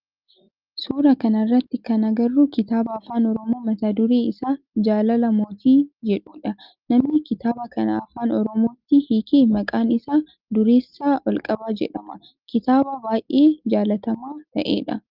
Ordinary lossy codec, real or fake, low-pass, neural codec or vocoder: Opus, 32 kbps; real; 5.4 kHz; none